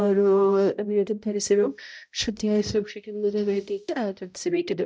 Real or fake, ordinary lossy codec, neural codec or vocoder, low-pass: fake; none; codec, 16 kHz, 0.5 kbps, X-Codec, HuBERT features, trained on balanced general audio; none